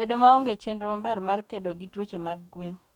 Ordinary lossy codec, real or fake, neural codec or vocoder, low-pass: none; fake; codec, 44.1 kHz, 2.6 kbps, DAC; 19.8 kHz